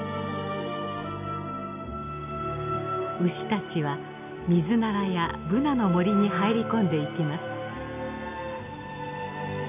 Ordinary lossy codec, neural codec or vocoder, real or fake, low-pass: none; none; real; 3.6 kHz